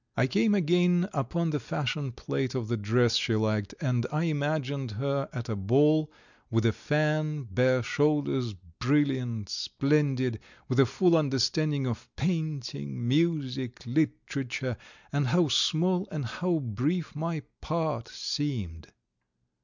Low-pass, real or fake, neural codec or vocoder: 7.2 kHz; real; none